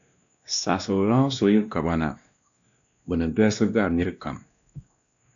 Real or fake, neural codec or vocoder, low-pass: fake; codec, 16 kHz, 1 kbps, X-Codec, WavLM features, trained on Multilingual LibriSpeech; 7.2 kHz